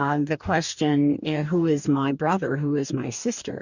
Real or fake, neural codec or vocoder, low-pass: fake; codec, 44.1 kHz, 2.6 kbps, DAC; 7.2 kHz